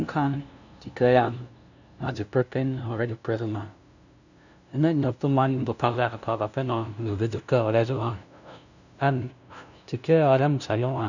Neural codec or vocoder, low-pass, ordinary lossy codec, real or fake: codec, 16 kHz, 0.5 kbps, FunCodec, trained on LibriTTS, 25 frames a second; 7.2 kHz; none; fake